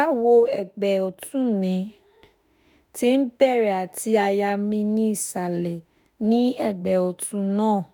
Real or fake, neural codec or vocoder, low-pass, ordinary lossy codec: fake; autoencoder, 48 kHz, 32 numbers a frame, DAC-VAE, trained on Japanese speech; none; none